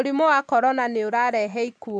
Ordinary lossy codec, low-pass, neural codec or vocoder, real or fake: none; none; none; real